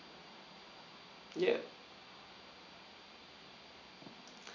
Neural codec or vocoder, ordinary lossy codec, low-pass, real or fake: none; none; 7.2 kHz; real